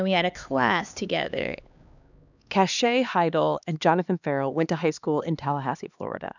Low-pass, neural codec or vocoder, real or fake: 7.2 kHz; codec, 16 kHz, 2 kbps, X-Codec, HuBERT features, trained on LibriSpeech; fake